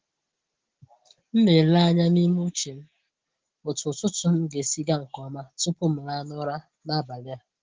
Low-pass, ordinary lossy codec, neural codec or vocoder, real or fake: 7.2 kHz; Opus, 16 kbps; none; real